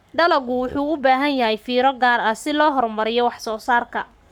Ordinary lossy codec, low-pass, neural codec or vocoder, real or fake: none; 19.8 kHz; codec, 44.1 kHz, 7.8 kbps, Pupu-Codec; fake